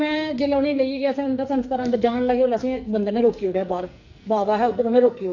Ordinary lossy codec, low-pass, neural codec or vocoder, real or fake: none; 7.2 kHz; codec, 44.1 kHz, 2.6 kbps, SNAC; fake